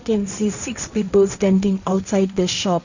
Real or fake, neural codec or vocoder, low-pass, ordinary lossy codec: fake; codec, 16 kHz, 1.1 kbps, Voila-Tokenizer; 7.2 kHz; none